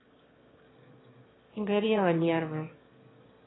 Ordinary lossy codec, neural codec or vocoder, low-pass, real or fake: AAC, 16 kbps; autoencoder, 22.05 kHz, a latent of 192 numbers a frame, VITS, trained on one speaker; 7.2 kHz; fake